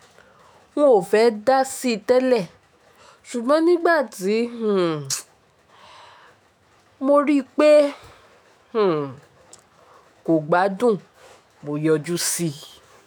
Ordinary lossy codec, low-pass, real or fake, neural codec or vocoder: none; none; fake; autoencoder, 48 kHz, 128 numbers a frame, DAC-VAE, trained on Japanese speech